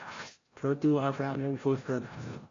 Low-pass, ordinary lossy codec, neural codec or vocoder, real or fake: 7.2 kHz; AAC, 32 kbps; codec, 16 kHz, 0.5 kbps, FreqCodec, larger model; fake